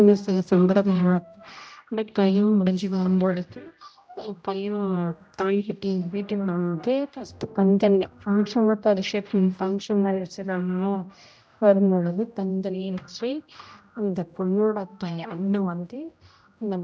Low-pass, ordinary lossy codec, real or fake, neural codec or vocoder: none; none; fake; codec, 16 kHz, 0.5 kbps, X-Codec, HuBERT features, trained on general audio